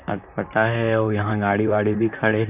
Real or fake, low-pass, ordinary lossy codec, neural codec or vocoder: fake; 3.6 kHz; none; vocoder, 44.1 kHz, 128 mel bands, Pupu-Vocoder